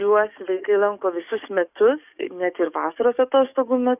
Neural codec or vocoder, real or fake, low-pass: codec, 44.1 kHz, 7.8 kbps, DAC; fake; 3.6 kHz